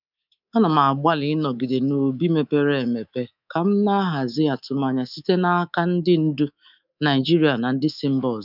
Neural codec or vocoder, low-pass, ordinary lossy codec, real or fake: codec, 24 kHz, 3.1 kbps, DualCodec; 5.4 kHz; none; fake